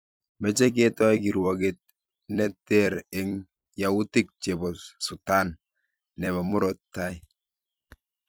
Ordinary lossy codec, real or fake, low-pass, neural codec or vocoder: none; fake; none; vocoder, 44.1 kHz, 128 mel bands every 256 samples, BigVGAN v2